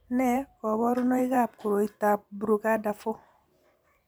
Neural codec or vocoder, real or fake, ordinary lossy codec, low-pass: vocoder, 44.1 kHz, 128 mel bands every 512 samples, BigVGAN v2; fake; none; none